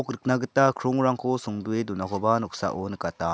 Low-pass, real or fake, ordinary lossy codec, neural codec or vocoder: none; real; none; none